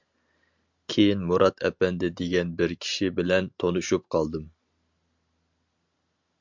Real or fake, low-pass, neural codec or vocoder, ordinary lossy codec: real; 7.2 kHz; none; MP3, 64 kbps